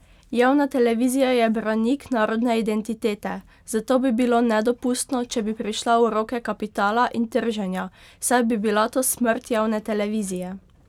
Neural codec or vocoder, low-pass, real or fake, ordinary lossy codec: none; 19.8 kHz; real; none